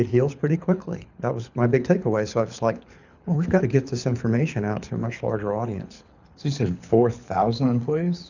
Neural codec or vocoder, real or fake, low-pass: codec, 24 kHz, 6 kbps, HILCodec; fake; 7.2 kHz